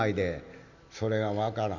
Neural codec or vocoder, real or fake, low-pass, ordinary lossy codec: none; real; 7.2 kHz; none